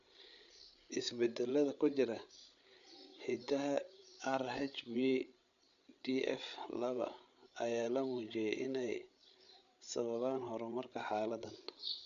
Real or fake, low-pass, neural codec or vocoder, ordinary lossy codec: fake; 7.2 kHz; codec, 16 kHz, 8 kbps, FreqCodec, larger model; none